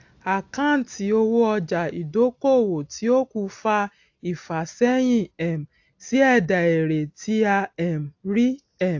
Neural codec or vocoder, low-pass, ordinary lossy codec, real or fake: none; 7.2 kHz; AAC, 48 kbps; real